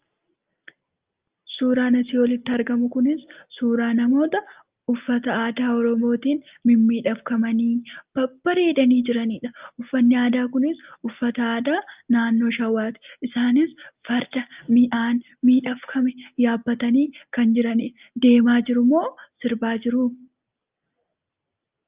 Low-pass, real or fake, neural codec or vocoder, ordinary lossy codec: 3.6 kHz; real; none; Opus, 24 kbps